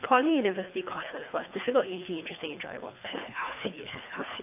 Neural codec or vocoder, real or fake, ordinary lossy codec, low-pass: codec, 24 kHz, 3 kbps, HILCodec; fake; AAC, 32 kbps; 3.6 kHz